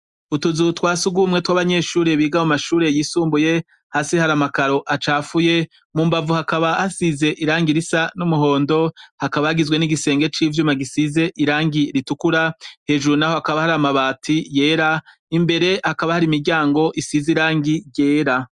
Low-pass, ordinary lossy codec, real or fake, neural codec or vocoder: 10.8 kHz; Opus, 64 kbps; real; none